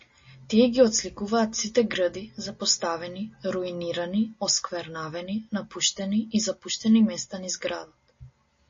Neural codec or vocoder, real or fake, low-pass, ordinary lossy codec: none; real; 7.2 kHz; MP3, 32 kbps